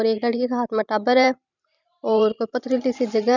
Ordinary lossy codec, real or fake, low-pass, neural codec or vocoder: none; fake; 7.2 kHz; vocoder, 44.1 kHz, 128 mel bands every 512 samples, BigVGAN v2